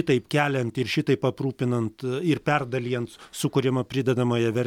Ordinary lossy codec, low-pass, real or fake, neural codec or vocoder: MP3, 96 kbps; 19.8 kHz; real; none